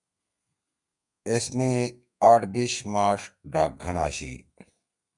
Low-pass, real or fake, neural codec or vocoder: 10.8 kHz; fake; codec, 32 kHz, 1.9 kbps, SNAC